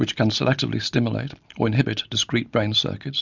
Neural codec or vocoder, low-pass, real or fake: none; 7.2 kHz; real